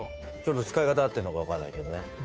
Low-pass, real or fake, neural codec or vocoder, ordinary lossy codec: none; fake; codec, 16 kHz, 2 kbps, FunCodec, trained on Chinese and English, 25 frames a second; none